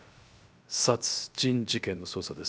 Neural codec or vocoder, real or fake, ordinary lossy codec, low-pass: codec, 16 kHz, 0.7 kbps, FocalCodec; fake; none; none